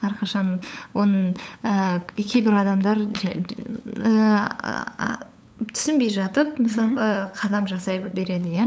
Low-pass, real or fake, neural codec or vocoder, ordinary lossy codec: none; fake; codec, 16 kHz, 8 kbps, FunCodec, trained on LibriTTS, 25 frames a second; none